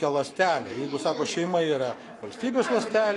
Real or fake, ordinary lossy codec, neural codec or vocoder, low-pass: fake; AAC, 48 kbps; codec, 44.1 kHz, 7.8 kbps, Pupu-Codec; 10.8 kHz